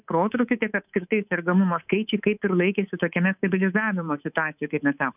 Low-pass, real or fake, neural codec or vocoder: 3.6 kHz; fake; codec, 24 kHz, 3.1 kbps, DualCodec